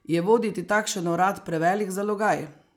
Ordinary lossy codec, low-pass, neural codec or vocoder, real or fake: none; 19.8 kHz; none; real